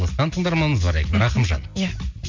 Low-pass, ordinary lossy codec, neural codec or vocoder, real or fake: 7.2 kHz; MP3, 48 kbps; none; real